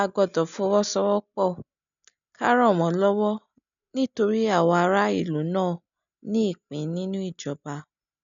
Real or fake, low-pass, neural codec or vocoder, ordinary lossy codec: real; 7.2 kHz; none; none